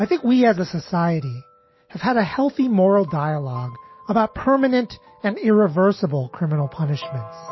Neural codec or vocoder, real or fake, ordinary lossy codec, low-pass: none; real; MP3, 24 kbps; 7.2 kHz